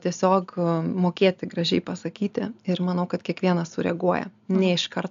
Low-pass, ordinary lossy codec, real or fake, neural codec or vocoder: 7.2 kHz; AAC, 96 kbps; real; none